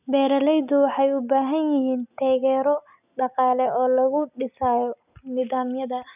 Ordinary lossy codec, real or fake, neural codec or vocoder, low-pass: none; real; none; 3.6 kHz